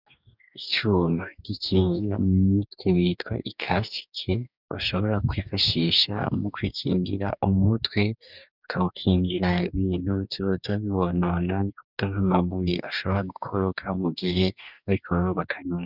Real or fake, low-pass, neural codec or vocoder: fake; 5.4 kHz; codec, 44.1 kHz, 2.6 kbps, DAC